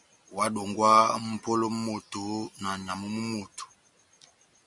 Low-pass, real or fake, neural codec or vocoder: 10.8 kHz; real; none